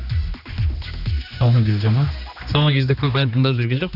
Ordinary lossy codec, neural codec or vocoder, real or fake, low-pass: none; codec, 16 kHz, 2 kbps, X-Codec, HuBERT features, trained on general audio; fake; 5.4 kHz